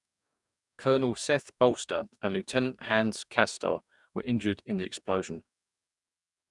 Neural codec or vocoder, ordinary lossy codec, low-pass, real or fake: codec, 44.1 kHz, 2.6 kbps, DAC; none; 10.8 kHz; fake